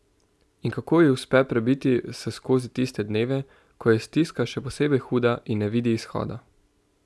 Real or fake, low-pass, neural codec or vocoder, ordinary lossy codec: real; none; none; none